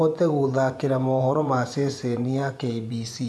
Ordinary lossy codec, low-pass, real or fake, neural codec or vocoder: none; none; fake; vocoder, 24 kHz, 100 mel bands, Vocos